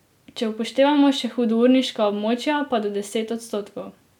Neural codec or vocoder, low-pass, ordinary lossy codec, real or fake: none; 19.8 kHz; none; real